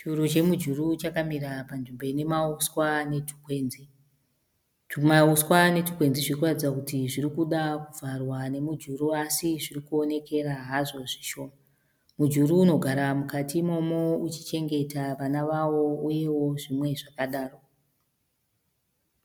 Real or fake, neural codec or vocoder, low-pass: real; none; 19.8 kHz